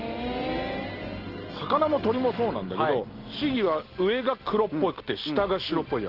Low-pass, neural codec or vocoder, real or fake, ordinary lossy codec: 5.4 kHz; none; real; Opus, 16 kbps